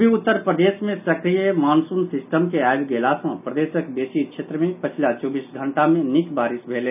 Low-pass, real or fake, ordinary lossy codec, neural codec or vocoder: 3.6 kHz; real; none; none